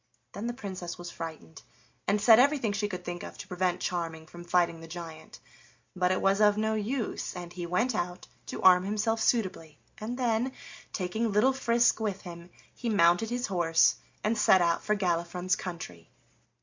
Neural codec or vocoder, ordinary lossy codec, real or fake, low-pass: none; MP3, 64 kbps; real; 7.2 kHz